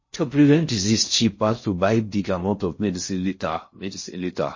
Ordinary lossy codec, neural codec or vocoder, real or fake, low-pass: MP3, 32 kbps; codec, 16 kHz in and 24 kHz out, 0.6 kbps, FocalCodec, streaming, 4096 codes; fake; 7.2 kHz